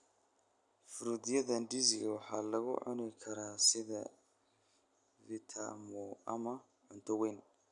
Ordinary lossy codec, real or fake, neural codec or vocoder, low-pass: none; real; none; 10.8 kHz